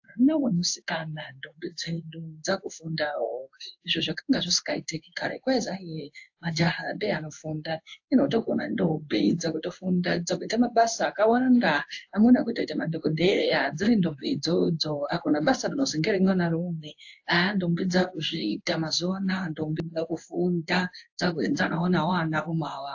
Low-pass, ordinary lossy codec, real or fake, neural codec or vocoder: 7.2 kHz; AAC, 48 kbps; fake; codec, 16 kHz in and 24 kHz out, 1 kbps, XY-Tokenizer